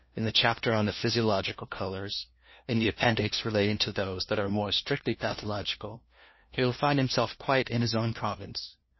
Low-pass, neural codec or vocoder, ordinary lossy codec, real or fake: 7.2 kHz; codec, 16 kHz, 1 kbps, FunCodec, trained on LibriTTS, 50 frames a second; MP3, 24 kbps; fake